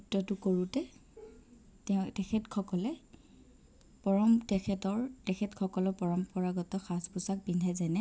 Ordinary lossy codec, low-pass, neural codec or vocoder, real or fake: none; none; none; real